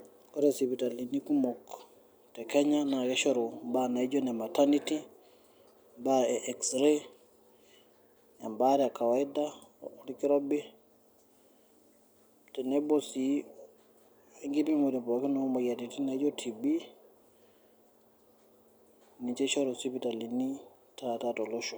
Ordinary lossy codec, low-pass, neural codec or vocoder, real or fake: none; none; none; real